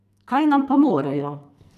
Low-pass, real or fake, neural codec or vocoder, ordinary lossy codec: 14.4 kHz; fake; codec, 44.1 kHz, 2.6 kbps, SNAC; none